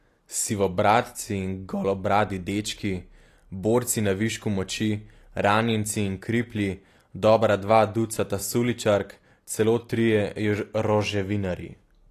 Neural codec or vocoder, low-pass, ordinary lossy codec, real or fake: none; 14.4 kHz; AAC, 48 kbps; real